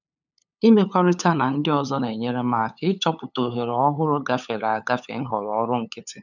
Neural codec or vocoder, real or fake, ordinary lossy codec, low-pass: codec, 16 kHz, 8 kbps, FunCodec, trained on LibriTTS, 25 frames a second; fake; none; 7.2 kHz